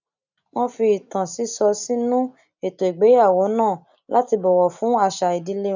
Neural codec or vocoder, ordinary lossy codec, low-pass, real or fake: none; none; 7.2 kHz; real